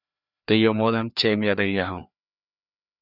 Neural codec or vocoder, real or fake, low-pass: codec, 16 kHz, 2 kbps, FreqCodec, larger model; fake; 5.4 kHz